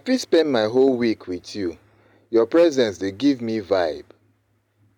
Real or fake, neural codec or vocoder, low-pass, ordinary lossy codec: real; none; 19.8 kHz; none